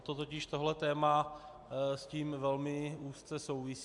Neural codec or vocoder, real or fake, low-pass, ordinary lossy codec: none; real; 10.8 kHz; AAC, 64 kbps